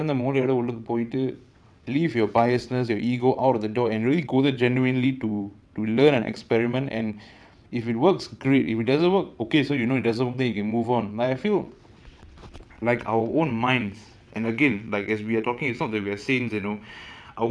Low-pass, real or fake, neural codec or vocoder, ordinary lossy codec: none; fake; vocoder, 22.05 kHz, 80 mel bands, WaveNeXt; none